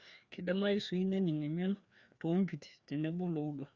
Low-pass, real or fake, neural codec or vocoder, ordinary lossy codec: 7.2 kHz; fake; codec, 16 kHz, 2 kbps, FreqCodec, larger model; Opus, 64 kbps